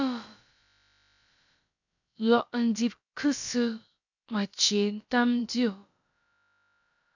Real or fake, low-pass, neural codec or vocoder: fake; 7.2 kHz; codec, 16 kHz, about 1 kbps, DyCAST, with the encoder's durations